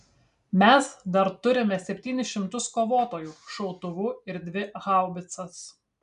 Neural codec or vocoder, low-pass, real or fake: none; 10.8 kHz; real